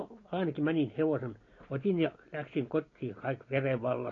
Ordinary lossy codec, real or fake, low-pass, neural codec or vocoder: AAC, 32 kbps; real; 7.2 kHz; none